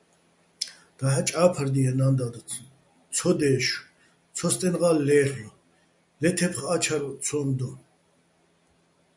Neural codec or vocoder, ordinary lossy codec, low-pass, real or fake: none; MP3, 96 kbps; 10.8 kHz; real